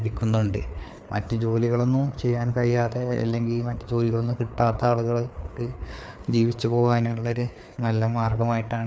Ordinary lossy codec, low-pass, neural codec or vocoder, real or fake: none; none; codec, 16 kHz, 4 kbps, FreqCodec, larger model; fake